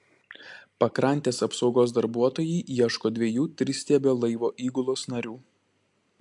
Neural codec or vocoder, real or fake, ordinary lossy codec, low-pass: none; real; AAC, 64 kbps; 10.8 kHz